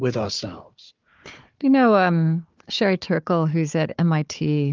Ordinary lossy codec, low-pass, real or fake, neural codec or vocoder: Opus, 32 kbps; 7.2 kHz; fake; codec, 16 kHz, 4 kbps, FunCodec, trained on Chinese and English, 50 frames a second